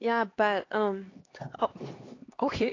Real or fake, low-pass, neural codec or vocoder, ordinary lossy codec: fake; 7.2 kHz; codec, 16 kHz, 2 kbps, X-Codec, HuBERT features, trained on LibriSpeech; AAC, 32 kbps